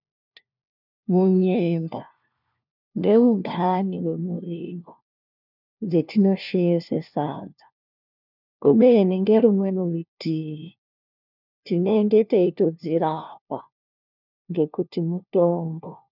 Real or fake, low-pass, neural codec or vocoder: fake; 5.4 kHz; codec, 16 kHz, 1 kbps, FunCodec, trained on LibriTTS, 50 frames a second